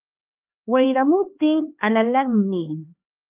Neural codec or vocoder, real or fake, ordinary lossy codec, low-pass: codec, 16 kHz, 4 kbps, X-Codec, HuBERT features, trained on LibriSpeech; fake; Opus, 32 kbps; 3.6 kHz